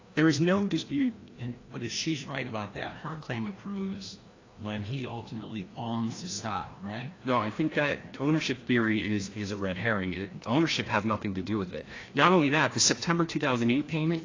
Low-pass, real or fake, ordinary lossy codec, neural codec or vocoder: 7.2 kHz; fake; AAC, 32 kbps; codec, 16 kHz, 1 kbps, FreqCodec, larger model